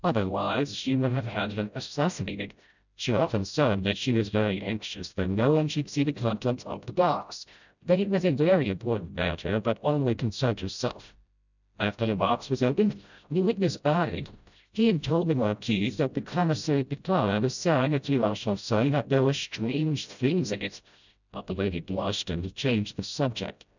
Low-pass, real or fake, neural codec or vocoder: 7.2 kHz; fake; codec, 16 kHz, 0.5 kbps, FreqCodec, smaller model